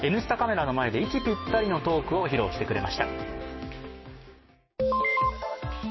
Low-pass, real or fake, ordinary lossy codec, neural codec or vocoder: 7.2 kHz; fake; MP3, 24 kbps; codec, 16 kHz, 6 kbps, DAC